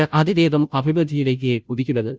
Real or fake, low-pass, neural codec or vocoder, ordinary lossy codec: fake; none; codec, 16 kHz, 0.5 kbps, FunCodec, trained on Chinese and English, 25 frames a second; none